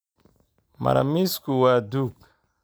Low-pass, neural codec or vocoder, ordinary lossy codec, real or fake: none; none; none; real